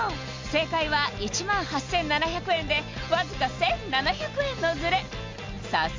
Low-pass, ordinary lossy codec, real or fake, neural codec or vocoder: 7.2 kHz; MP3, 64 kbps; real; none